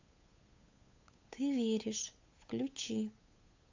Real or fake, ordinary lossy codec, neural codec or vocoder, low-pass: fake; AAC, 48 kbps; codec, 16 kHz, 8 kbps, FunCodec, trained on Chinese and English, 25 frames a second; 7.2 kHz